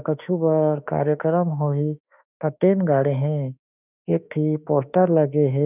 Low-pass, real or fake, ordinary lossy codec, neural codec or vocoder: 3.6 kHz; fake; none; autoencoder, 48 kHz, 32 numbers a frame, DAC-VAE, trained on Japanese speech